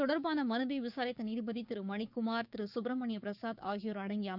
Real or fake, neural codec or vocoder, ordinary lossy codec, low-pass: fake; codec, 16 kHz, 6 kbps, DAC; none; 5.4 kHz